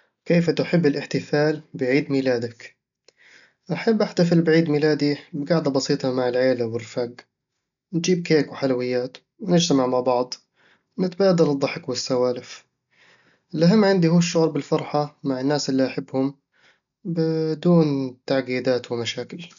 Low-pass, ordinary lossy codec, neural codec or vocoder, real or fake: 7.2 kHz; none; none; real